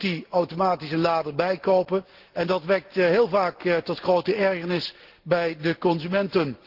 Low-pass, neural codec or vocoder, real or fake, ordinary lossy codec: 5.4 kHz; none; real; Opus, 16 kbps